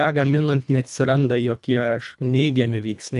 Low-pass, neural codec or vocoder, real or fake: 10.8 kHz; codec, 24 kHz, 1.5 kbps, HILCodec; fake